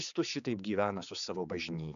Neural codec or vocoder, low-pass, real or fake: codec, 16 kHz, 4 kbps, X-Codec, HuBERT features, trained on general audio; 7.2 kHz; fake